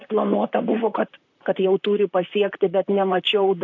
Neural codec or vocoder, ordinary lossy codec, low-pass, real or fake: vocoder, 44.1 kHz, 128 mel bands, Pupu-Vocoder; AAC, 48 kbps; 7.2 kHz; fake